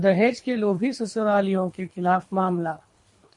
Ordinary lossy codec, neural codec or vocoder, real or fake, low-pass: MP3, 48 kbps; codec, 24 kHz, 3 kbps, HILCodec; fake; 10.8 kHz